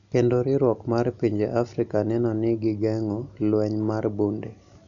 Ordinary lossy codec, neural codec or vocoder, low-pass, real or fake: none; none; 7.2 kHz; real